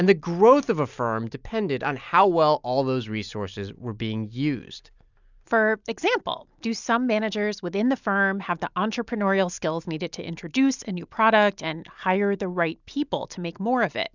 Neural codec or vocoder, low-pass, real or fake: none; 7.2 kHz; real